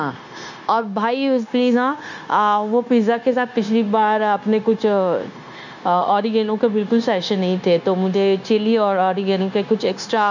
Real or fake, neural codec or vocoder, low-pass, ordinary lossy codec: fake; codec, 16 kHz, 0.9 kbps, LongCat-Audio-Codec; 7.2 kHz; none